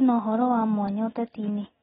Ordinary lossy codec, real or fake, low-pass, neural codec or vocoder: AAC, 16 kbps; real; 7.2 kHz; none